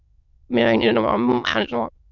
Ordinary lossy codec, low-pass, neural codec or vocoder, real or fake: MP3, 64 kbps; 7.2 kHz; autoencoder, 22.05 kHz, a latent of 192 numbers a frame, VITS, trained on many speakers; fake